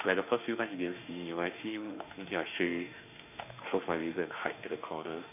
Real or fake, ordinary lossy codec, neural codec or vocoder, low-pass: fake; none; autoencoder, 48 kHz, 32 numbers a frame, DAC-VAE, trained on Japanese speech; 3.6 kHz